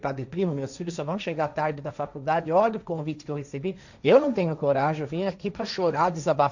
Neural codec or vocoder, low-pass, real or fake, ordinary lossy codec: codec, 16 kHz, 1.1 kbps, Voila-Tokenizer; 7.2 kHz; fake; none